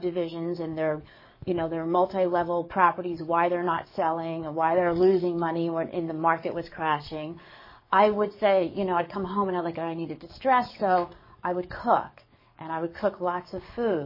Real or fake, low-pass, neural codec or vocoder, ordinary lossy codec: fake; 5.4 kHz; codec, 44.1 kHz, 7.8 kbps, DAC; MP3, 24 kbps